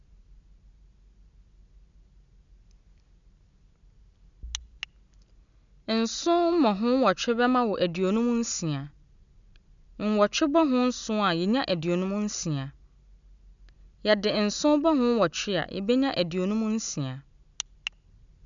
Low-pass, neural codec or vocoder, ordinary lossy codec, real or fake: 7.2 kHz; none; none; real